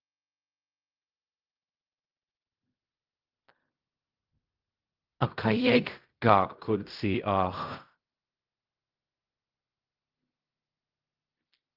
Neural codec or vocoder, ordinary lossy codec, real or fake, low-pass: codec, 16 kHz in and 24 kHz out, 0.4 kbps, LongCat-Audio-Codec, fine tuned four codebook decoder; Opus, 32 kbps; fake; 5.4 kHz